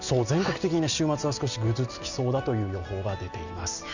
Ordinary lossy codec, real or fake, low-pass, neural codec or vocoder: none; real; 7.2 kHz; none